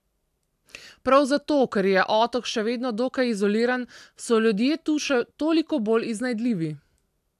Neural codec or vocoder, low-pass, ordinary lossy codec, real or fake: none; 14.4 kHz; AAC, 96 kbps; real